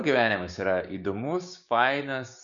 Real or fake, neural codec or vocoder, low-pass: fake; codec, 16 kHz, 6 kbps, DAC; 7.2 kHz